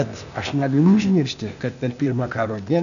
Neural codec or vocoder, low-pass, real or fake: codec, 16 kHz, 0.8 kbps, ZipCodec; 7.2 kHz; fake